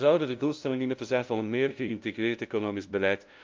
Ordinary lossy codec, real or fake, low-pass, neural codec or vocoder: Opus, 24 kbps; fake; 7.2 kHz; codec, 16 kHz, 0.5 kbps, FunCodec, trained on LibriTTS, 25 frames a second